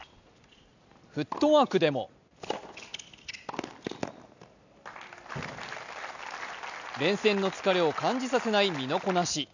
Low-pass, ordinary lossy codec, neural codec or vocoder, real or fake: 7.2 kHz; none; none; real